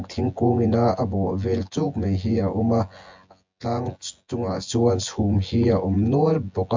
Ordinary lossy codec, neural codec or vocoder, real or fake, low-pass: MP3, 64 kbps; vocoder, 24 kHz, 100 mel bands, Vocos; fake; 7.2 kHz